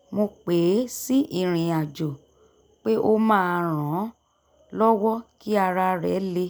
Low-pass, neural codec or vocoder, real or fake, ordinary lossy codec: none; none; real; none